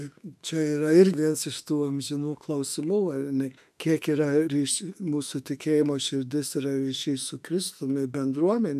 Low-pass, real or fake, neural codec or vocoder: 14.4 kHz; fake; autoencoder, 48 kHz, 32 numbers a frame, DAC-VAE, trained on Japanese speech